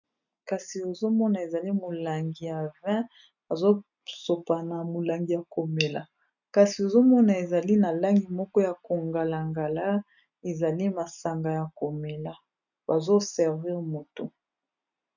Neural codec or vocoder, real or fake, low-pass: none; real; 7.2 kHz